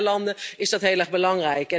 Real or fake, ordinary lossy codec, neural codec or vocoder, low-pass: real; none; none; none